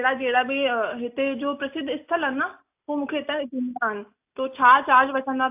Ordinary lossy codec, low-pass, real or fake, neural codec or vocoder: AAC, 32 kbps; 3.6 kHz; real; none